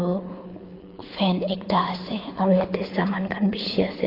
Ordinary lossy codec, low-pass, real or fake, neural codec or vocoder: AAC, 24 kbps; 5.4 kHz; fake; codec, 24 kHz, 6 kbps, HILCodec